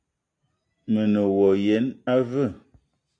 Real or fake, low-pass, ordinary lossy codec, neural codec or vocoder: real; 9.9 kHz; AAC, 64 kbps; none